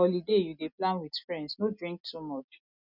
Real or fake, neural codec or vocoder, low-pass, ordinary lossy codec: real; none; 5.4 kHz; none